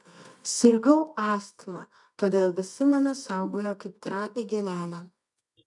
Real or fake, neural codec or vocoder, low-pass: fake; codec, 24 kHz, 0.9 kbps, WavTokenizer, medium music audio release; 10.8 kHz